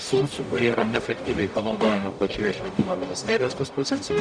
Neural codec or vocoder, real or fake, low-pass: codec, 44.1 kHz, 0.9 kbps, DAC; fake; 9.9 kHz